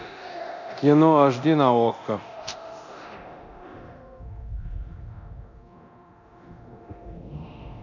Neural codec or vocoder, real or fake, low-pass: codec, 24 kHz, 0.9 kbps, DualCodec; fake; 7.2 kHz